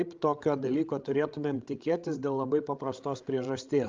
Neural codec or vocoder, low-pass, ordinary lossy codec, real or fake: codec, 16 kHz, 16 kbps, FreqCodec, larger model; 7.2 kHz; Opus, 32 kbps; fake